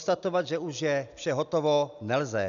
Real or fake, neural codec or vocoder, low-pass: real; none; 7.2 kHz